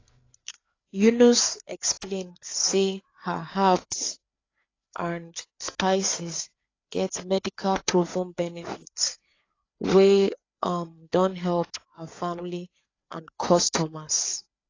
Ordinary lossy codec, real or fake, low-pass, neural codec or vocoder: AAC, 32 kbps; fake; 7.2 kHz; codec, 16 kHz, 4 kbps, FreqCodec, larger model